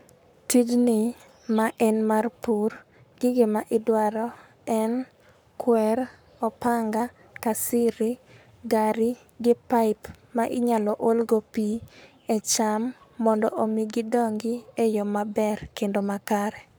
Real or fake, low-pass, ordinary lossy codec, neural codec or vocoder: fake; none; none; codec, 44.1 kHz, 7.8 kbps, Pupu-Codec